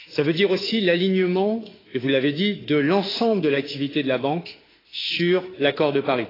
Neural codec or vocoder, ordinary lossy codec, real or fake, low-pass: autoencoder, 48 kHz, 32 numbers a frame, DAC-VAE, trained on Japanese speech; AAC, 24 kbps; fake; 5.4 kHz